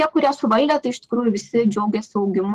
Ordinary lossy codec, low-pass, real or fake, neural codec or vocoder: Opus, 16 kbps; 14.4 kHz; real; none